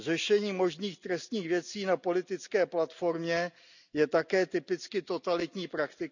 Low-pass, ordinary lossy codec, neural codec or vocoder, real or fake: 7.2 kHz; none; none; real